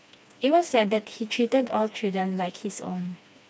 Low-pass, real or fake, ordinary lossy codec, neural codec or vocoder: none; fake; none; codec, 16 kHz, 2 kbps, FreqCodec, smaller model